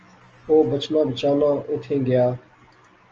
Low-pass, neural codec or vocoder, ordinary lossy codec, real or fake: 7.2 kHz; none; Opus, 24 kbps; real